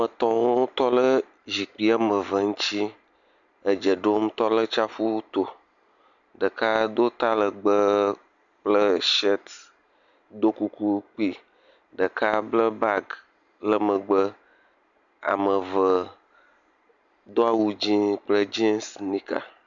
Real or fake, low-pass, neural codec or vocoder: real; 7.2 kHz; none